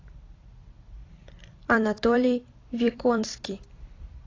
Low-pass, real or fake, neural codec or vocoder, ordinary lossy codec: 7.2 kHz; fake; vocoder, 44.1 kHz, 128 mel bands every 256 samples, BigVGAN v2; AAC, 32 kbps